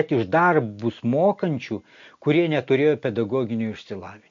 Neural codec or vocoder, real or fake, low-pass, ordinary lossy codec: none; real; 7.2 kHz; MP3, 48 kbps